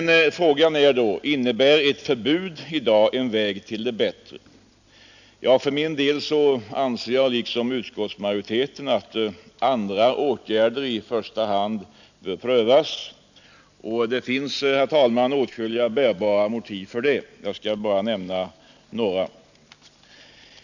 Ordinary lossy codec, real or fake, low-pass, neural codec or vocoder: none; real; 7.2 kHz; none